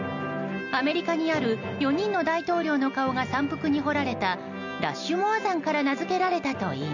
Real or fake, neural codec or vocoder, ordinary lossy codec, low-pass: real; none; none; 7.2 kHz